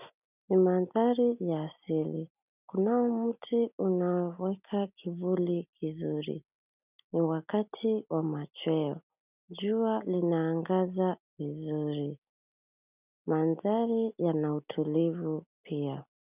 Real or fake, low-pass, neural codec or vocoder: real; 3.6 kHz; none